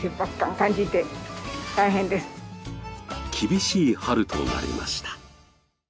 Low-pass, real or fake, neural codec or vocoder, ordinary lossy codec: none; real; none; none